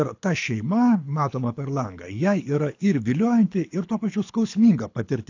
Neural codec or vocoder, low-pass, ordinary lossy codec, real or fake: codec, 24 kHz, 6 kbps, HILCodec; 7.2 kHz; AAC, 48 kbps; fake